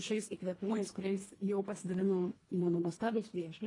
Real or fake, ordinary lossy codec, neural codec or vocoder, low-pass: fake; AAC, 32 kbps; codec, 24 kHz, 1.5 kbps, HILCodec; 10.8 kHz